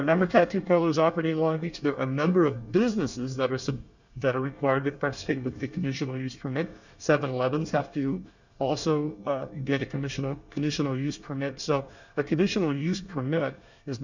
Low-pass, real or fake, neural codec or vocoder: 7.2 kHz; fake; codec, 24 kHz, 1 kbps, SNAC